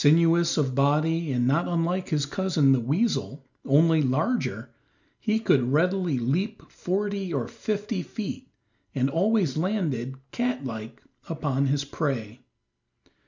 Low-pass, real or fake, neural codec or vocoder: 7.2 kHz; real; none